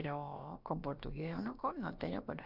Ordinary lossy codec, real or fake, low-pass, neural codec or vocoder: none; fake; 5.4 kHz; codec, 16 kHz, about 1 kbps, DyCAST, with the encoder's durations